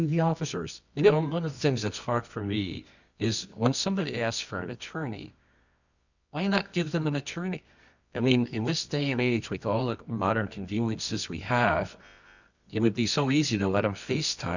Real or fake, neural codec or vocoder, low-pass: fake; codec, 24 kHz, 0.9 kbps, WavTokenizer, medium music audio release; 7.2 kHz